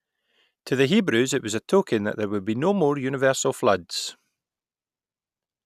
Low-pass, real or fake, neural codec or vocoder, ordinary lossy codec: 14.4 kHz; real; none; none